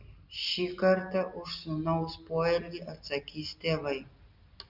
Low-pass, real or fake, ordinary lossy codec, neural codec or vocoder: 5.4 kHz; real; Opus, 64 kbps; none